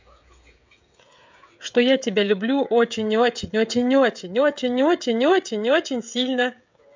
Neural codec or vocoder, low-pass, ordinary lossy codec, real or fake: codec, 16 kHz, 16 kbps, FreqCodec, smaller model; 7.2 kHz; MP3, 64 kbps; fake